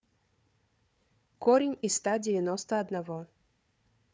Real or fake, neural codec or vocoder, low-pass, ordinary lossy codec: fake; codec, 16 kHz, 4 kbps, FunCodec, trained on Chinese and English, 50 frames a second; none; none